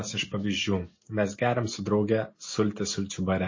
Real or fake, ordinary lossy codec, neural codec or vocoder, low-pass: real; MP3, 32 kbps; none; 7.2 kHz